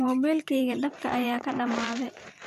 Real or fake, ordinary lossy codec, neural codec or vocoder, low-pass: fake; none; vocoder, 44.1 kHz, 128 mel bands every 512 samples, BigVGAN v2; 14.4 kHz